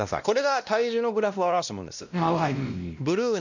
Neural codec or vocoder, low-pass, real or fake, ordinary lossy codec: codec, 16 kHz, 1 kbps, X-Codec, WavLM features, trained on Multilingual LibriSpeech; 7.2 kHz; fake; none